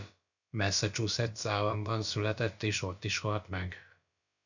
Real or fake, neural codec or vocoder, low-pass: fake; codec, 16 kHz, about 1 kbps, DyCAST, with the encoder's durations; 7.2 kHz